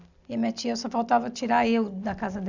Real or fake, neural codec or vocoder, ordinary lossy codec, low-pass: real; none; none; 7.2 kHz